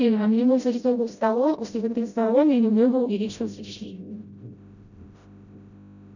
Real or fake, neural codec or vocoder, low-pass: fake; codec, 16 kHz, 0.5 kbps, FreqCodec, smaller model; 7.2 kHz